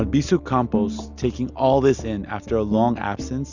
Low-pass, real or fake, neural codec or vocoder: 7.2 kHz; real; none